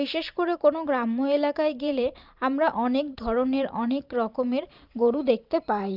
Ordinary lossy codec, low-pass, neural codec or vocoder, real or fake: Opus, 24 kbps; 5.4 kHz; vocoder, 44.1 kHz, 128 mel bands every 512 samples, BigVGAN v2; fake